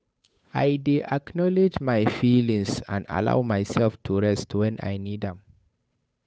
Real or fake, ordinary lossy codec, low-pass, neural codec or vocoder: fake; none; none; codec, 16 kHz, 8 kbps, FunCodec, trained on Chinese and English, 25 frames a second